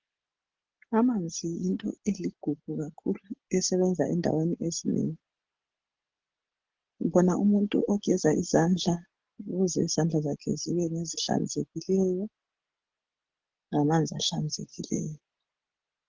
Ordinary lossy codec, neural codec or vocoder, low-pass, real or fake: Opus, 16 kbps; none; 7.2 kHz; real